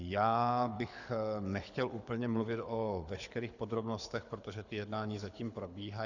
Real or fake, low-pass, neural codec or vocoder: fake; 7.2 kHz; codec, 24 kHz, 6 kbps, HILCodec